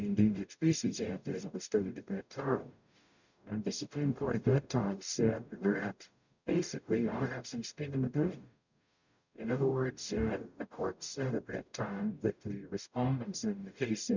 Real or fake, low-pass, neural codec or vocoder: fake; 7.2 kHz; codec, 44.1 kHz, 0.9 kbps, DAC